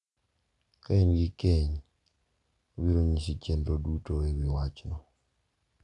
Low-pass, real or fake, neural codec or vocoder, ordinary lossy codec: 10.8 kHz; real; none; none